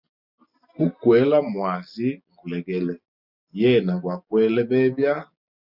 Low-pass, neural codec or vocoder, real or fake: 5.4 kHz; none; real